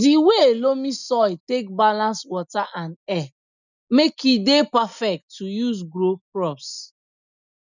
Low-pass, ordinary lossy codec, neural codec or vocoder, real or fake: 7.2 kHz; none; none; real